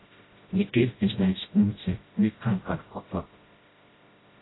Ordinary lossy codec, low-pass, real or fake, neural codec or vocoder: AAC, 16 kbps; 7.2 kHz; fake; codec, 16 kHz, 0.5 kbps, FreqCodec, smaller model